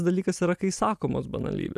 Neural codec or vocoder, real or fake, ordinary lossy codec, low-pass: none; real; AAC, 96 kbps; 14.4 kHz